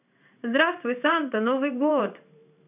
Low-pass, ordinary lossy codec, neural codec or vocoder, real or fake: 3.6 kHz; none; codec, 16 kHz in and 24 kHz out, 1 kbps, XY-Tokenizer; fake